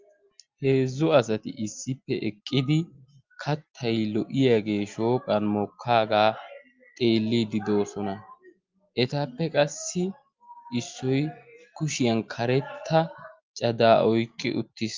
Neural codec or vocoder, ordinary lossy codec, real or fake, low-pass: none; Opus, 24 kbps; real; 7.2 kHz